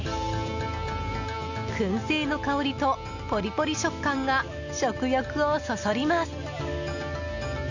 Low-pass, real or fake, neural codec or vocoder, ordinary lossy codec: 7.2 kHz; real; none; none